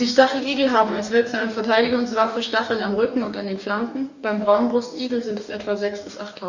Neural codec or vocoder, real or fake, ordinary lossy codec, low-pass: codec, 44.1 kHz, 2.6 kbps, DAC; fake; Opus, 64 kbps; 7.2 kHz